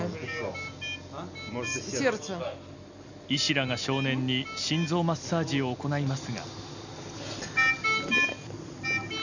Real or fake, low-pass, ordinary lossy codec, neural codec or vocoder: real; 7.2 kHz; none; none